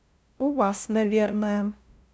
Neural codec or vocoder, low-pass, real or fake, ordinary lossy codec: codec, 16 kHz, 0.5 kbps, FunCodec, trained on LibriTTS, 25 frames a second; none; fake; none